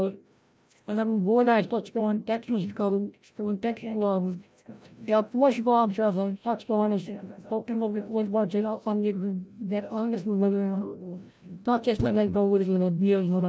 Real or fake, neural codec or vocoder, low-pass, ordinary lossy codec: fake; codec, 16 kHz, 0.5 kbps, FreqCodec, larger model; none; none